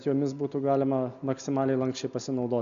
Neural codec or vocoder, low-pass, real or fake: none; 7.2 kHz; real